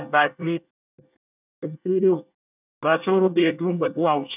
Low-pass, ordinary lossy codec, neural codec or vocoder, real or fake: 3.6 kHz; none; codec, 24 kHz, 1 kbps, SNAC; fake